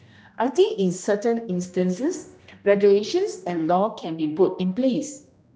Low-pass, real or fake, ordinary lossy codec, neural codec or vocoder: none; fake; none; codec, 16 kHz, 1 kbps, X-Codec, HuBERT features, trained on general audio